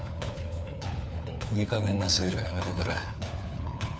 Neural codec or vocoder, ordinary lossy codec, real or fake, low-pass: codec, 16 kHz, 4 kbps, FunCodec, trained on LibriTTS, 50 frames a second; none; fake; none